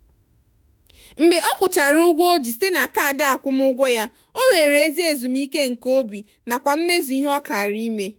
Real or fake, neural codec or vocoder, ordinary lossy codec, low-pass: fake; autoencoder, 48 kHz, 32 numbers a frame, DAC-VAE, trained on Japanese speech; none; none